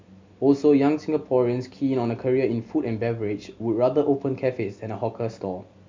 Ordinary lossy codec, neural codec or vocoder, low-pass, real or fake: MP3, 64 kbps; none; 7.2 kHz; real